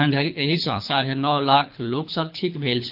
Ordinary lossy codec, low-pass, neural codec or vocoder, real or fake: AAC, 48 kbps; 5.4 kHz; codec, 24 kHz, 3 kbps, HILCodec; fake